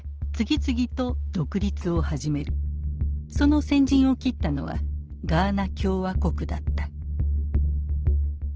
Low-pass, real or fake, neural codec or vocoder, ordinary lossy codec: 7.2 kHz; real; none; Opus, 16 kbps